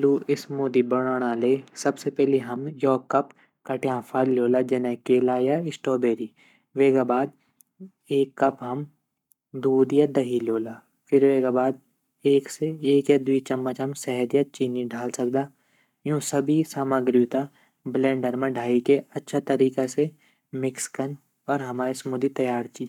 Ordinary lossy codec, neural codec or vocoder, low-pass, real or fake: none; codec, 44.1 kHz, 7.8 kbps, Pupu-Codec; 19.8 kHz; fake